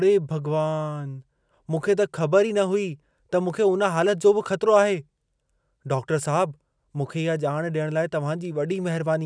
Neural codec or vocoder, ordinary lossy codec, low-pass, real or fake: none; none; 9.9 kHz; real